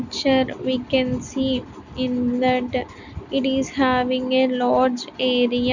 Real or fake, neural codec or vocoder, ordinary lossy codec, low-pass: real; none; none; 7.2 kHz